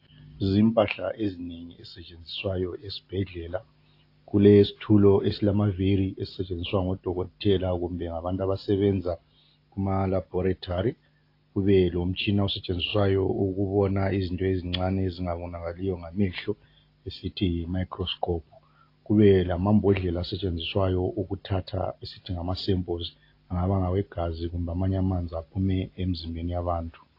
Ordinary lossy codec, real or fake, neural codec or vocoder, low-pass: AAC, 32 kbps; real; none; 5.4 kHz